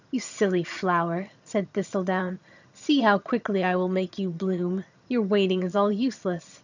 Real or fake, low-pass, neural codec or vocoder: fake; 7.2 kHz; vocoder, 22.05 kHz, 80 mel bands, HiFi-GAN